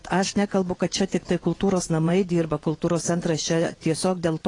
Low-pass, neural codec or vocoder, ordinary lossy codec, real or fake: 10.8 kHz; vocoder, 24 kHz, 100 mel bands, Vocos; AAC, 32 kbps; fake